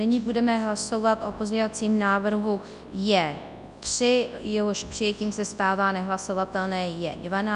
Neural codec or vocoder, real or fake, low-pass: codec, 24 kHz, 0.9 kbps, WavTokenizer, large speech release; fake; 10.8 kHz